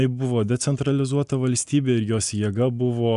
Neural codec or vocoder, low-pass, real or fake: none; 10.8 kHz; real